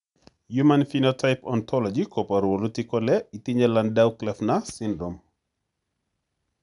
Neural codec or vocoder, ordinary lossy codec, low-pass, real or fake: none; MP3, 96 kbps; 10.8 kHz; real